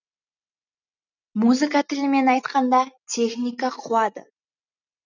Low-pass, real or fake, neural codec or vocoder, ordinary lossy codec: 7.2 kHz; real; none; none